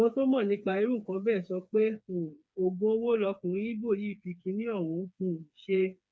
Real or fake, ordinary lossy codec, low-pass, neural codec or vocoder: fake; none; none; codec, 16 kHz, 4 kbps, FreqCodec, smaller model